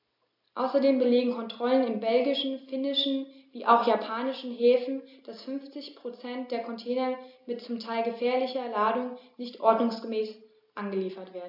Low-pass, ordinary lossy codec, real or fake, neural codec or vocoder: 5.4 kHz; AAC, 48 kbps; real; none